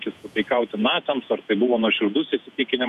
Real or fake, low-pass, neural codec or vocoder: fake; 14.4 kHz; vocoder, 48 kHz, 128 mel bands, Vocos